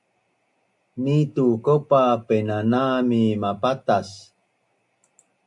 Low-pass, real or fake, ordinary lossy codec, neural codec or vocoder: 10.8 kHz; real; AAC, 64 kbps; none